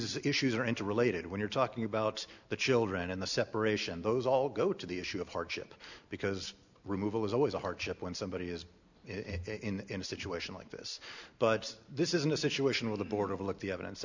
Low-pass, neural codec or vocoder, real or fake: 7.2 kHz; none; real